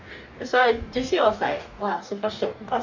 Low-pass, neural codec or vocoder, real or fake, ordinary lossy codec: 7.2 kHz; codec, 44.1 kHz, 2.6 kbps, DAC; fake; none